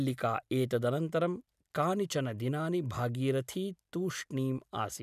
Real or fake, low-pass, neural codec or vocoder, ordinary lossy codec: real; 14.4 kHz; none; none